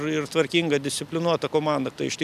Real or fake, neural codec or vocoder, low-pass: real; none; 14.4 kHz